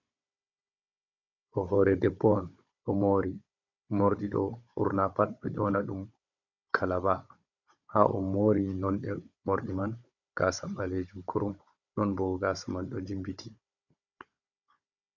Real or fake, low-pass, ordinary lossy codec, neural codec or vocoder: fake; 7.2 kHz; MP3, 64 kbps; codec, 16 kHz, 16 kbps, FunCodec, trained on Chinese and English, 50 frames a second